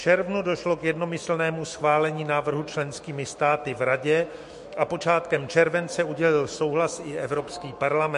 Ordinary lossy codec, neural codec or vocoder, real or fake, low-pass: MP3, 48 kbps; autoencoder, 48 kHz, 128 numbers a frame, DAC-VAE, trained on Japanese speech; fake; 14.4 kHz